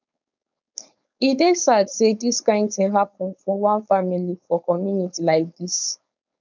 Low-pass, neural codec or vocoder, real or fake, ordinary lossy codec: 7.2 kHz; codec, 16 kHz, 4.8 kbps, FACodec; fake; none